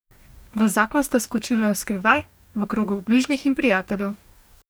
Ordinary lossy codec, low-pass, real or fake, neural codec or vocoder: none; none; fake; codec, 44.1 kHz, 2.6 kbps, DAC